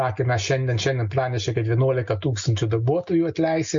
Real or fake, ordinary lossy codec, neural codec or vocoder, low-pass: real; MP3, 48 kbps; none; 7.2 kHz